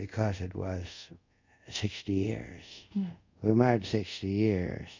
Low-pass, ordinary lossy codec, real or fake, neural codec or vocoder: 7.2 kHz; MP3, 64 kbps; fake; codec, 24 kHz, 0.5 kbps, DualCodec